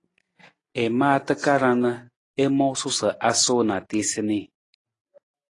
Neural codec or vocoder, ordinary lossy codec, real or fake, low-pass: none; AAC, 32 kbps; real; 10.8 kHz